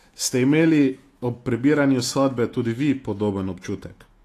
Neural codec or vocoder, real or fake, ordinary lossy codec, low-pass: autoencoder, 48 kHz, 128 numbers a frame, DAC-VAE, trained on Japanese speech; fake; AAC, 48 kbps; 14.4 kHz